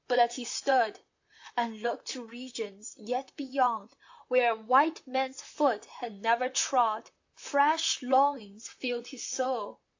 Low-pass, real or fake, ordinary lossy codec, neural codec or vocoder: 7.2 kHz; fake; AAC, 48 kbps; vocoder, 44.1 kHz, 128 mel bands, Pupu-Vocoder